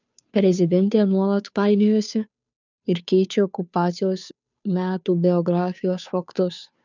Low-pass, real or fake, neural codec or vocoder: 7.2 kHz; fake; codec, 16 kHz, 2 kbps, FunCodec, trained on Chinese and English, 25 frames a second